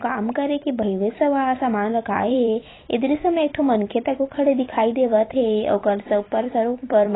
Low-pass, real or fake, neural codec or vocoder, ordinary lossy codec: 7.2 kHz; fake; vocoder, 44.1 kHz, 128 mel bands every 256 samples, BigVGAN v2; AAC, 16 kbps